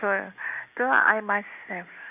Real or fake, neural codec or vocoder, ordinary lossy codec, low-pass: real; none; none; 3.6 kHz